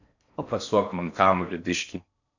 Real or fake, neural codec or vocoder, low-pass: fake; codec, 16 kHz in and 24 kHz out, 0.6 kbps, FocalCodec, streaming, 4096 codes; 7.2 kHz